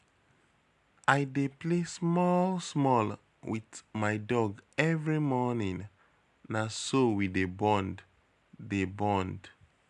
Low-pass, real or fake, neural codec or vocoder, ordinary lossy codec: 10.8 kHz; real; none; none